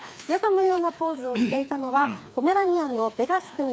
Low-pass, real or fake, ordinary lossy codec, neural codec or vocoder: none; fake; none; codec, 16 kHz, 2 kbps, FreqCodec, larger model